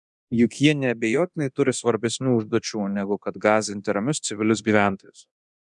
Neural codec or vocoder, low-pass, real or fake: codec, 24 kHz, 0.9 kbps, DualCodec; 10.8 kHz; fake